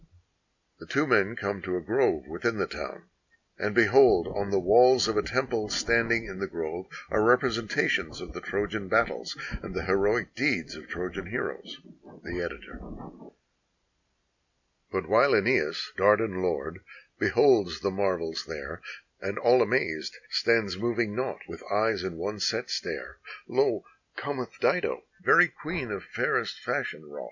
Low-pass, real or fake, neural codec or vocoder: 7.2 kHz; real; none